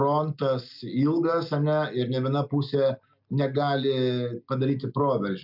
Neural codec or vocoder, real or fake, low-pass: none; real; 5.4 kHz